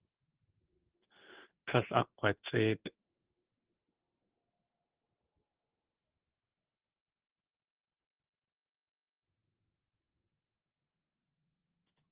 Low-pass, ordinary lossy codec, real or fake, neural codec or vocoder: 3.6 kHz; Opus, 16 kbps; fake; codec, 16 kHz in and 24 kHz out, 1 kbps, XY-Tokenizer